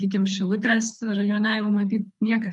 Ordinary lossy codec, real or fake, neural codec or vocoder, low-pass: MP3, 96 kbps; fake; codec, 24 kHz, 3 kbps, HILCodec; 10.8 kHz